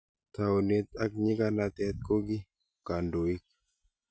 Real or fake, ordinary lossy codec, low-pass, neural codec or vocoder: real; none; none; none